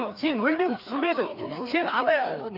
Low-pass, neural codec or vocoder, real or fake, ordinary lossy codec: 5.4 kHz; codec, 16 kHz, 2 kbps, FreqCodec, larger model; fake; none